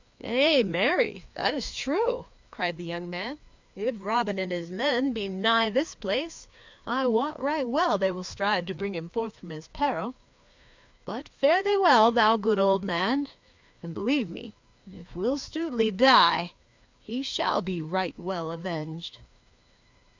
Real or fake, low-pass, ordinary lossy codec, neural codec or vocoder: fake; 7.2 kHz; MP3, 64 kbps; codec, 16 kHz, 2 kbps, FreqCodec, larger model